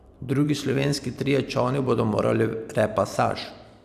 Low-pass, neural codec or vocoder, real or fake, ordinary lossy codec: 14.4 kHz; vocoder, 44.1 kHz, 128 mel bands every 256 samples, BigVGAN v2; fake; none